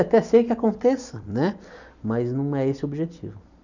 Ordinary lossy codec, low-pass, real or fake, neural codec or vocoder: none; 7.2 kHz; real; none